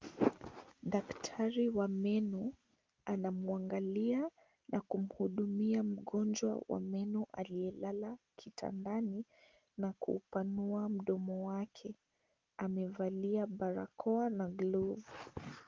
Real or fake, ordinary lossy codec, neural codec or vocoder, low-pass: real; Opus, 24 kbps; none; 7.2 kHz